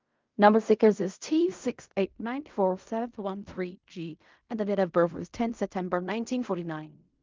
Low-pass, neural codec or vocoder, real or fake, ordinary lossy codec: 7.2 kHz; codec, 16 kHz in and 24 kHz out, 0.4 kbps, LongCat-Audio-Codec, fine tuned four codebook decoder; fake; Opus, 24 kbps